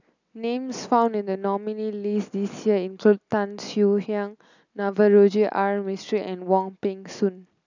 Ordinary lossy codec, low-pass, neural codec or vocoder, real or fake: none; 7.2 kHz; none; real